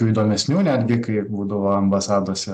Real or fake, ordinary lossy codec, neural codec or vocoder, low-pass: real; AAC, 96 kbps; none; 14.4 kHz